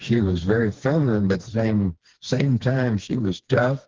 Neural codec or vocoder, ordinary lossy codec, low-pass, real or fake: codec, 16 kHz, 2 kbps, FreqCodec, smaller model; Opus, 16 kbps; 7.2 kHz; fake